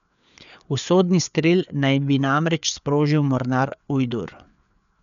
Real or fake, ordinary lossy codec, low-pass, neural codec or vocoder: fake; none; 7.2 kHz; codec, 16 kHz, 4 kbps, FreqCodec, larger model